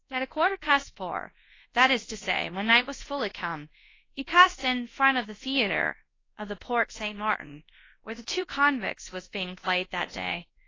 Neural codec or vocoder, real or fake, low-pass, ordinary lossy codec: codec, 24 kHz, 0.9 kbps, WavTokenizer, large speech release; fake; 7.2 kHz; AAC, 32 kbps